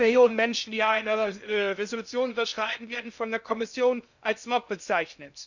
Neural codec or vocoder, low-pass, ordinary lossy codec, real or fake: codec, 16 kHz in and 24 kHz out, 0.6 kbps, FocalCodec, streaming, 2048 codes; 7.2 kHz; none; fake